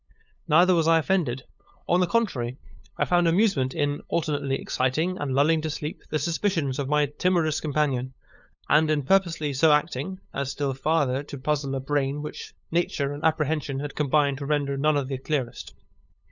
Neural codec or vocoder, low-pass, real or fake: codec, 16 kHz, 8 kbps, FunCodec, trained on LibriTTS, 25 frames a second; 7.2 kHz; fake